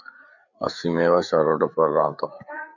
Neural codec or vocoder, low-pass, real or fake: codec, 16 kHz, 4 kbps, FreqCodec, larger model; 7.2 kHz; fake